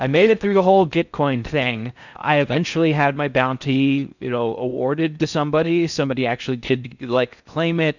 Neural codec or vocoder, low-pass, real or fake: codec, 16 kHz in and 24 kHz out, 0.6 kbps, FocalCodec, streaming, 4096 codes; 7.2 kHz; fake